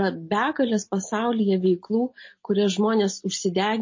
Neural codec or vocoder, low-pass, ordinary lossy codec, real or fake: none; 7.2 kHz; MP3, 32 kbps; real